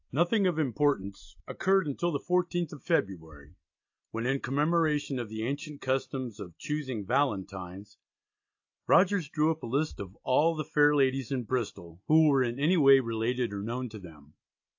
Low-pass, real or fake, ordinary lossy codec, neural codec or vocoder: 7.2 kHz; real; AAC, 48 kbps; none